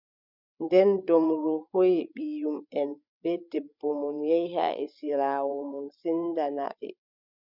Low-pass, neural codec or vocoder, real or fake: 5.4 kHz; codec, 16 kHz, 16 kbps, FreqCodec, larger model; fake